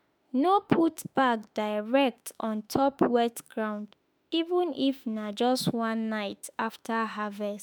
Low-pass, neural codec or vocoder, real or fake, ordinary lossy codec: none; autoencoder, 48 kHz, 32 numbers a frame, DAC-VAE, trained on Japanese speech; fake; none